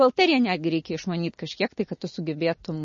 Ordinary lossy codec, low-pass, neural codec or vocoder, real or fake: MP3, 32 kbps; 7.2 kHz; none; real